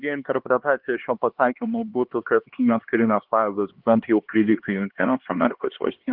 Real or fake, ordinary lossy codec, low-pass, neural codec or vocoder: fake; Opus, 16 kbps; 5.4 kHz; codec, 16 kHz, 2 kbps, X-Codec, HuBERT features, trained on LibriSpeech